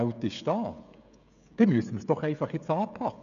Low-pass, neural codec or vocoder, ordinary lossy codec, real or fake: 7.2 kHz; codec, 16 kHz, 16 kbps, FreqCodec, smaller model; none; fake